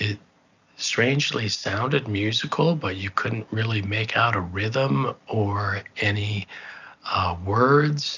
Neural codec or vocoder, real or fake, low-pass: none; real; 7.2 kHz